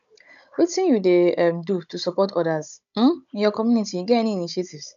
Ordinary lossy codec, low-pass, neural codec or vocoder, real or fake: none; 7.2 kHz; codec, 16 kHz, 16 kbps, FunCodec, trained on Chinese and English, 50 frames a second; fake